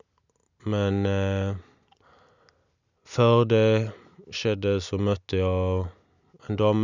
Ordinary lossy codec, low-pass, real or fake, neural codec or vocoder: none; 7.2 kHz; real; none